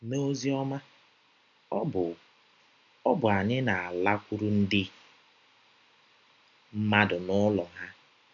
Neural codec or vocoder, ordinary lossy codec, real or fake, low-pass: none; none; real; 7.2 kHz